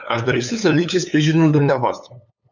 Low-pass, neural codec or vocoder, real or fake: 7.2 kHz; codec, 16 kHz, 8 kbps, FunCodec, trained on LibriTTS, 25 frames a second; fake